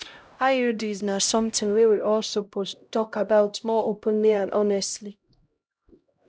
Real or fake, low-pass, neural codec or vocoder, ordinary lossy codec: fake; none; codec, 16 kHz, 0.5 kbps, X-Codec, HuBERT features, trained on LibriSpeech; none